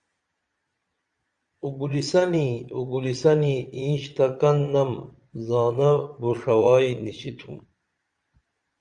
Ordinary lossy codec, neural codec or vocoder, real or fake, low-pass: Opus, 64 kbps; vocoder, 22.05 kHz, 80 mel bands, Vocos; fake; 9.9 kHz